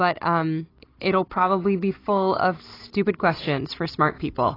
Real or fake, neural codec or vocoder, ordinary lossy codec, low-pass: real; none; AAC, 24 kbps; 5.4 kHz